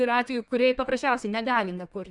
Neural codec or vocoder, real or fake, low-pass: codec, 32 kHz, 1.9 kbps, SNAC; fake; 10.8 kHz